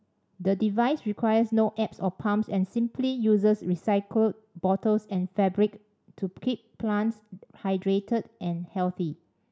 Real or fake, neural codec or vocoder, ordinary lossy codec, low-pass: real; none; none; none